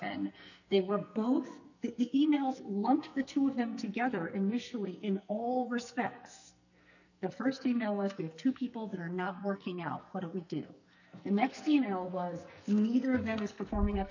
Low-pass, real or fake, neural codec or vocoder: 7.2 kHz; fake; codec, 44.1 kHz, 2.6 kbps, SNAC